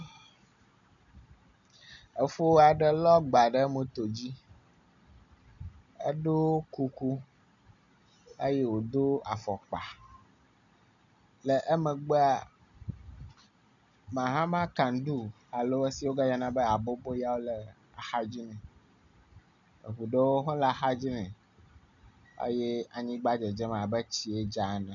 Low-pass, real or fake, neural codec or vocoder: 7.2 kHz; real; none